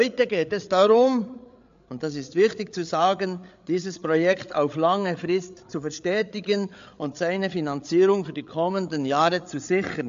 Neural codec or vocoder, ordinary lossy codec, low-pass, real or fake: codec, 16 kHz, 8 kbps, FreqCodec, larger model; AAC, 64 kbps; 7.2 kHz; fake